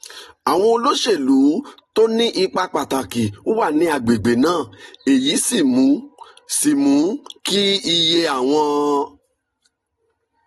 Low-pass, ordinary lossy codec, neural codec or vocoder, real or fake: 19.8 kHz; AAC, 32 kbps; none; real